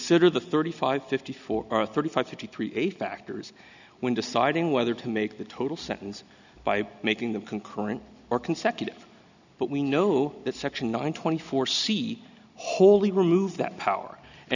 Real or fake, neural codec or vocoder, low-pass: real; none; 7.2 kHz